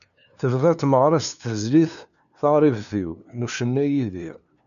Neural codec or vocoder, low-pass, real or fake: codec, 16 kHz, 2 kbps, FunCodec, trained on LibriTTS, 25 frames a second; 7.2 kHz; fake